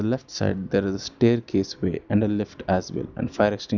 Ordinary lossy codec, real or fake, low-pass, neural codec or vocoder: none; real; 7.2 kHz; none